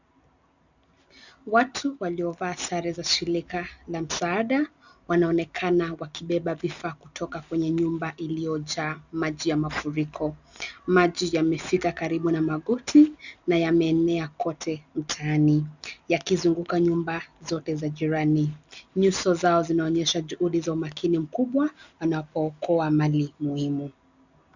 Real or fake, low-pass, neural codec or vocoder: real; 7.2 kHz; none